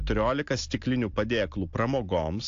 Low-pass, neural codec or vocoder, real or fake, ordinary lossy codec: 7.2 kHz; none; real; AAC, 48 kbps